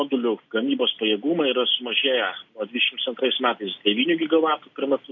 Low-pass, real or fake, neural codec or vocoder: 7.2 kHz; real; none